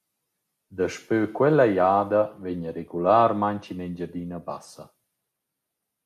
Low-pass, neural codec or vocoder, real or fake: 14.4 kHz; none; real